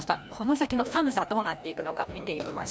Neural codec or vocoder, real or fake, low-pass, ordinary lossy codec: codec, 16 kHz, 1 kbps, FreqCodec, larger model; fake; none; none